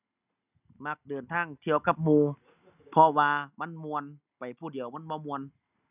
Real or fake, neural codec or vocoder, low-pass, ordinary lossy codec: real; none; 3.6 kHz; none